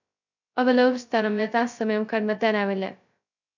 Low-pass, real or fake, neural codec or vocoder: 7.2 kHz; fake; codec, 16 kHz, 0.2 kbps, FocalCodec